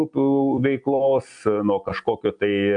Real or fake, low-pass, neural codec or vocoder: real; 9.9 kHz; none